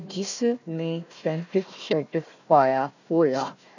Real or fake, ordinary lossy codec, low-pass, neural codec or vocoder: fake; none; 7.2 kHz; codec, 16 kHz, 1 kbps, FunCodec, trained on Chinese and English, 50 frames a second